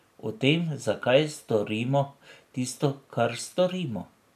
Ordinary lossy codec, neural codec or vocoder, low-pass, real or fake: none; none; 14.4 kHz; real